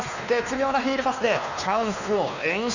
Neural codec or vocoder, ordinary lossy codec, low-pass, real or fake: codec, 16 kHz, 2 kbps, X-Codec, WavLM features, trained on Multilingual LibriSpeech; none; 7.2 kHz; fake